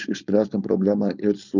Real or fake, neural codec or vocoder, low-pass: fake; codec, 16 kHz, 4.8 kbps, FACodec; 7.2 kHz